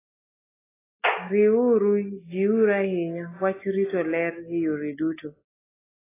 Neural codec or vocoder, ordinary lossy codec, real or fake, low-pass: none; AAC, 16 kbps; real; 3.6 kHz